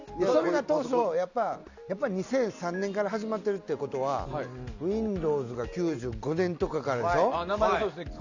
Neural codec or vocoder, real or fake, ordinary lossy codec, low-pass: none; real; none; 7.2 kHz